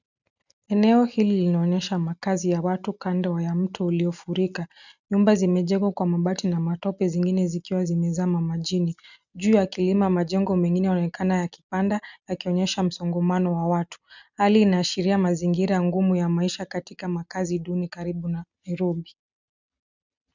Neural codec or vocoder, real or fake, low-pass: none; real; 7.2 kHz